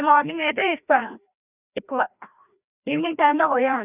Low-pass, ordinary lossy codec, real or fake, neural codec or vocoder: 3.6 kHz; none; fake; codec, 16 kHz, 1 kbps, FreqCodec, larger model